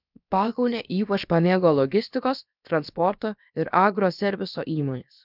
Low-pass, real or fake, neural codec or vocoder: 5.4 kHz; fake; codec, 16 kHz, about 1 kbps, DyCAST, with the encoder's durations